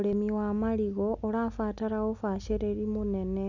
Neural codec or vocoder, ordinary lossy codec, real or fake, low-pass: none; none; real; 7.2 kHz